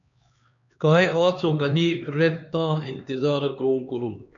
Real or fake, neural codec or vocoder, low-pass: fake; codec, 16 kHz, 2 kbps, X-Codec, HuBERT features, trained on LibriSpeech; 7.2 kHz